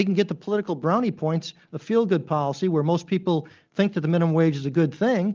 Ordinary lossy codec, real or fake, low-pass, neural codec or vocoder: Opus, 32 kbps; real; 7.2 kHz; none